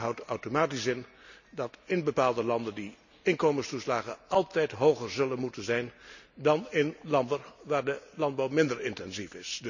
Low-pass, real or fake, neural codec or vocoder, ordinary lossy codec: 7.2 kHz; real; none; none